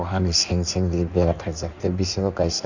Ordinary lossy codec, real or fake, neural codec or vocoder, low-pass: none; fake; codec, 16 kHz in and 24 kHz out, 1.1 kbps, FireRedTTS-2 codec; 7.2 kHz